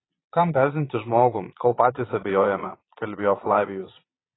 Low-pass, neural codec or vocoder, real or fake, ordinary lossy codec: 7.2 kHz; vocoder, 22.05 kHz, 80 mel bands, Vocos; fake; AAC, 16 kbps